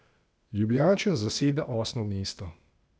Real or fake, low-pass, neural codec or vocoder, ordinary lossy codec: fake; none; codec, 16 kHz, 0.8 kbps, ZipCodec; none